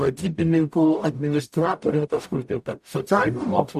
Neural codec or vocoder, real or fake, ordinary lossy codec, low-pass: codec, 44.1 kHz, 0.9 kbps, DAC; fake; AAC, 96 kbps; 14.4 kHz